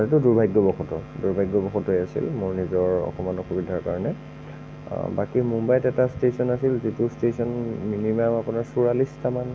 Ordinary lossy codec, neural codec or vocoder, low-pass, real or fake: none; none; none; real